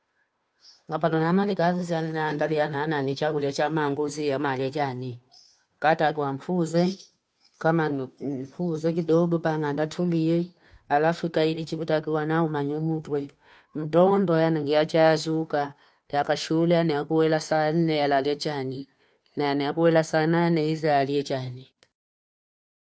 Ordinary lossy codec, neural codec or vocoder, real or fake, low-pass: none; codec, 16 kHz, 2 kbps, FunCodec, trained on Chinese and English, 25 frames a second; fake; none